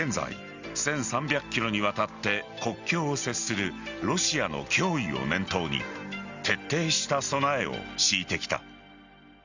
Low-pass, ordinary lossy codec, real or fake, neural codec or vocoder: 7.2 kHz; Opus, 64 kbps; real; none